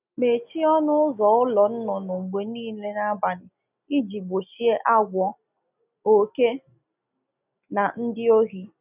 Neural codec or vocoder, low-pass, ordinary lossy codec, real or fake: none; 3.6 kHz; none; real